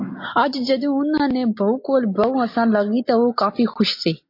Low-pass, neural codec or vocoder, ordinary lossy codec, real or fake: 5.4 kHz; none; MP3, 32 kbps; real